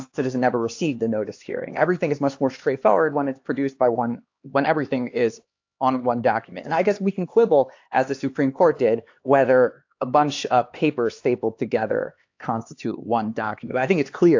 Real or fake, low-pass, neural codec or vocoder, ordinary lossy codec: fake; 7.2 kHz; codec, 16 kHz, 2 kbps, X-Codec, HuBERT features, trained on LibriSpeech; AAC, 48 kbps